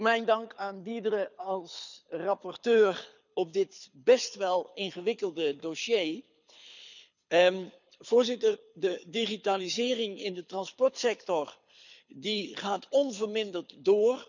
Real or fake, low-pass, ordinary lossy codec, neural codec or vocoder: fake; 7.2 kHz; none; codec, 24 kHz, 6 kbps, HILCodec